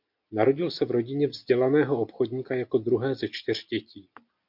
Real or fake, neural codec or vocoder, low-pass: real; none; 5.4 kHz